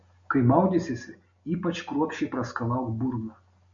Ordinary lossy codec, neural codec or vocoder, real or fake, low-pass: MP3, 48 kbps; none; real; 7.2 kHz